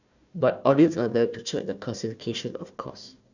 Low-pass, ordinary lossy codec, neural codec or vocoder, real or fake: 7.2 kHz; none; codec, 16 kHz, 1 kbps, FunCodec, trained on Chinese and English, 50 frames a second; fake